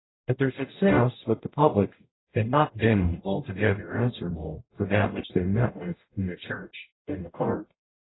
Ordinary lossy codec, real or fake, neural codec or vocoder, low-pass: AAC, 16 kbps; fake; codec, 44.1 kHz, 0.9 kbps, DAC; 7.2 kHz